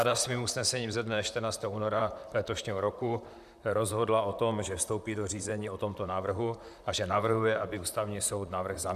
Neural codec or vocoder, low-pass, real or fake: vocoder, 44.1 kHz, 128 mel bands, Pupu-Vocoder; 14.4 kHz; fake